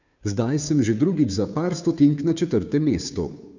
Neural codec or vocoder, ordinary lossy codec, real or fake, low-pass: codec, 16 kHz, 2 kbps, FunCodec, trained on Chinese and English, 25 frames a second; none; fake; 7.2 kHz